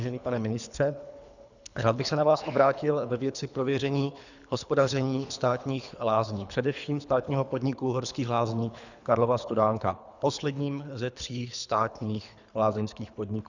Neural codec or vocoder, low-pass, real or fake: codec, 24 kHz, 3 kbps, HILCodec; 7.2 kHz; fake